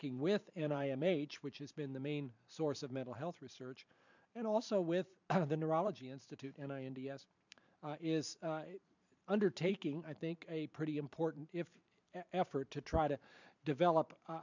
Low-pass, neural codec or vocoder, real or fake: 7.2 kHz; vocoder, 44.1 kHz, 128 mel bands every 512 samples, BigVGAN v2; fake